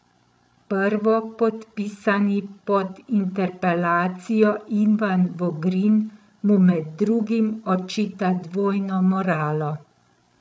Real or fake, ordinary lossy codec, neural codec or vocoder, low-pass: fake; none; codec, 16 kHz, 8 kbps, FreqCodec, larger model; none